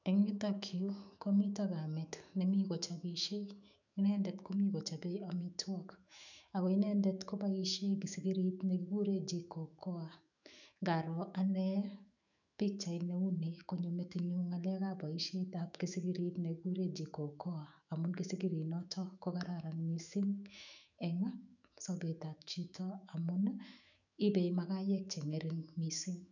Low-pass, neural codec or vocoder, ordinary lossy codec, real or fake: 7.2 kHz; autoencoder, 48 kHz, 128 numbers a frame, DAC-VAE, trained on Japanese speech; none; fake